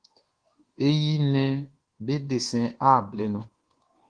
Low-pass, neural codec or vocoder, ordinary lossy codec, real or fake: 9.9 kHz; codec, 24 kHz, 1.2 kbps, DualCodec; Opus, 16 kbps; fake